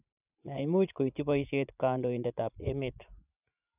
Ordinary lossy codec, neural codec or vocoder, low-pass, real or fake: none; vocoder, 44.1 kHz, 80 mel bands, Vocos; 3.6 kHz; fake